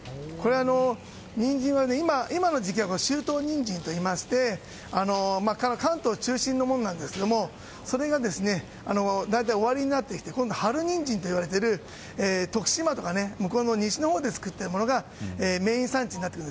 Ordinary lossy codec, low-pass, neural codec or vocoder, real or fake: none; none; none; real